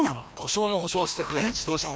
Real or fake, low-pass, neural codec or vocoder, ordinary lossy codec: fake; none; codec, 16 kHz, 1 kbps, FreqCodec, larger model; none